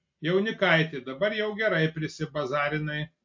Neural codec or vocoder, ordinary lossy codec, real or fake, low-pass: none; MP3, 48 kbps; real; 7.2 kHz